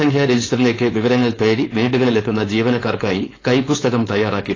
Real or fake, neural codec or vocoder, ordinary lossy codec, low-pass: fake; codec, 16 kHz, 4.8 kbps, FACodec; AAC, 32 kbps; 7.2 kHz